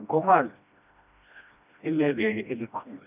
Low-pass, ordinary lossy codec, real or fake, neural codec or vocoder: 3.6 kHz; none; fake; codec, 16 kHz, 1 kbps, FreqCodec, smaller model